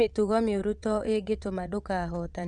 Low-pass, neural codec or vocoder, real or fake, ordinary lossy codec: 9.9 kHz; vocoder, 22.05 kHz, 80 mel bands, WaveNeXt; fake; none